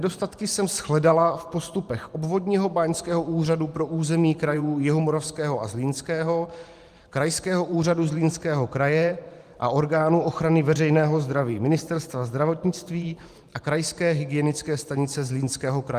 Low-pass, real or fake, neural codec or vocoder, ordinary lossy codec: 14.4 kHz; fake; vocoder, 44.1 kHz, 128 mel bands every 512 samples, BigVGAN v2; Opus, 32 kbps